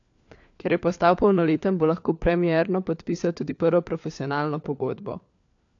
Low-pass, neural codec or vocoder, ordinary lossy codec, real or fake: 7.2 kHz; codec, 16 kHz, 4 kbps, FunCodec, trained on LibriTTS, 50 frames a second; AAC, 48 kbps; fake